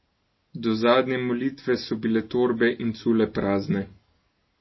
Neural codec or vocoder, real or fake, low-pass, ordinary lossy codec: none; real; 7.2 kHz; MP3, 24 kbps